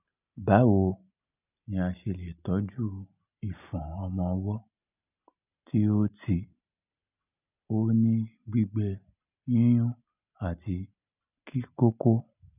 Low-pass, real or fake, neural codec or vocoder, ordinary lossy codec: 3.6 kHz; real; none; none